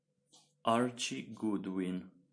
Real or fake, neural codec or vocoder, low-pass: fake; vocoder, 44.1 kHz, 128 mel bands every 512 samples, BigVGAN v2; 9.9 kHz